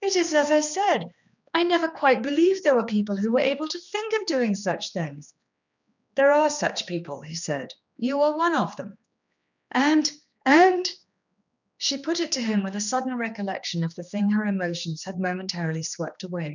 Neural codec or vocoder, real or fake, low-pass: codec, 16 kHz, 2 kbps, X-Codec, HuBERT features, trained on general audio; fake; 7.2 kHz